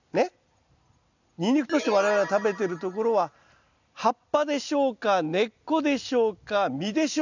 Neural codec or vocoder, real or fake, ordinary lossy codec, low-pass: none; real; none; 7.2 kHz